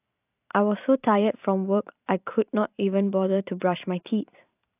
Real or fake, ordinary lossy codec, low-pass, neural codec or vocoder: fake; AAC, 32 kbps; 3.6 kHz; vocoder, 44.1 kHz, 128 mel bands every 512 samples, BigVGAN v2